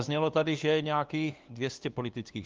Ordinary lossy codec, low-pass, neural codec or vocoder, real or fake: Opus, 24 kbps; 7.2 kHz; codec, 16 kHz, 2 kbps, FunCodec, trained on LibriTTS, 25 frames a second; fake